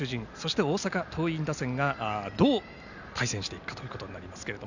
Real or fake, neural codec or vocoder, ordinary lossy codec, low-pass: real; none; none; 7.2 kHz